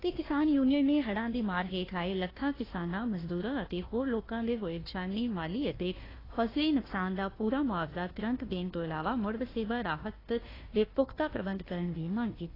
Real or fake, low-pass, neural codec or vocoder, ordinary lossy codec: fake; 5.4 kHz; codec, 16 kHz, 1 kbps, FunCodec, trained on Chinese and English, 50 frames a second; AAC, 24 kbps